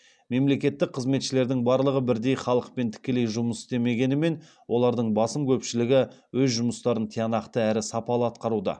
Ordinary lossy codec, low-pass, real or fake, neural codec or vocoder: none; 9.9 kHz; real; none